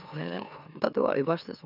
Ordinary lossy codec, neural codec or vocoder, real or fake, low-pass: none; autoencoder, 44.1 kHz, a latent of 192 numbers a frame, MeloTTS; fake; 5.4 kHz